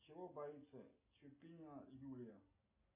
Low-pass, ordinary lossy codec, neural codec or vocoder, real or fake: 3.6 kHz; MP3, 24 kbps; none; real